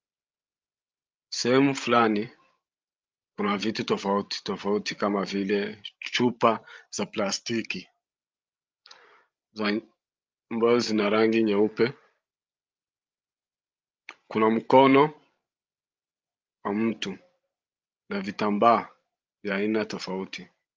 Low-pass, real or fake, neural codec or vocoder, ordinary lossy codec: 7.2 kHz; fake; codec, 16 kHz, 16 kbps, FreqCodec, larger model; Opus, 24 kbps